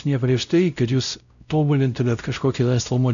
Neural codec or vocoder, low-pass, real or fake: codec, 16 kHz, 0.5 kbps, X-Codec, WavLM features, trained on Multilingual LibriSpeech; 7.2 kHz; fake